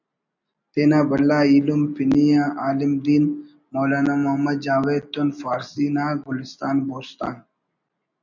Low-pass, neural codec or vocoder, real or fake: 7.2 kHz; none; real